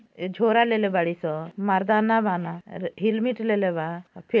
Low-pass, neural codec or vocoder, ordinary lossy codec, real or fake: none; none; none; real